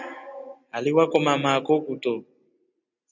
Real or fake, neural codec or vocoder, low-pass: real; none; 7.2 kHz